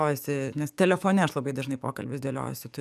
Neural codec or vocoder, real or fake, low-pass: codec, 44.1 kHz, 7.8 kbps, DAC; fake; 14.4 kHz